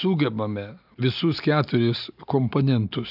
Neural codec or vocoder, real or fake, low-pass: none; real; 5.4 kHz